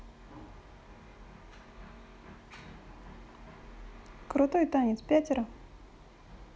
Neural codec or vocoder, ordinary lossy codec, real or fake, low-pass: none; none; real; none